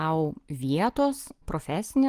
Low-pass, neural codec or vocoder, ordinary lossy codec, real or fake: 14.4 kHz; none; Opus, 24 kbps; real